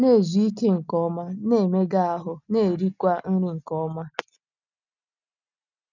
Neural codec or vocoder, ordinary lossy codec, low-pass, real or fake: none; none; 7.2 kHz; real